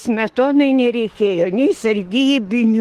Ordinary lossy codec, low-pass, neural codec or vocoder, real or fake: Opus, 16 kbps; 14.4 kHz; autoencoder, 48 kHz, 32 numbers a frame, DAC-VAE, trained on Japanese speech; fake